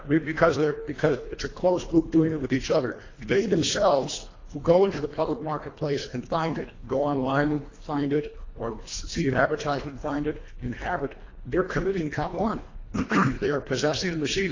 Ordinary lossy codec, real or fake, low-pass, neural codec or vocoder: AAC, 32 kbps; fake; 7.2 kHz; codec, 24 kHz, 1.5 kbps, HILCodec